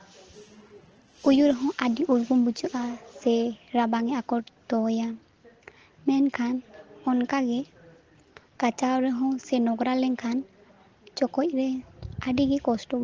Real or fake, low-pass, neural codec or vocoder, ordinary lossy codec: real; 7.2 kHz; none; Opus, 16 kbps